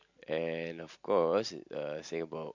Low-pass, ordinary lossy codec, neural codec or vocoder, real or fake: 7.2 kHz; MP3, 48 kbps; none; real